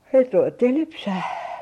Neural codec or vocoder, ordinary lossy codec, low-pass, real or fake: vocoder, 44.1 kHz, 128 mel bands every 512 samples, BigVGAN v2; MP3, 64 kbps; 19.8 kHz; fake